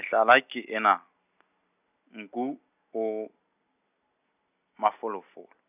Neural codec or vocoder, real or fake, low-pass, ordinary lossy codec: none; real; 3.6 kHz; none